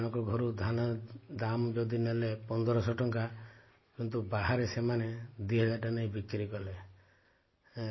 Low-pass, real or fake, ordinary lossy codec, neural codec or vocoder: 7.2 kHz; real; MP3, 24 kbps; none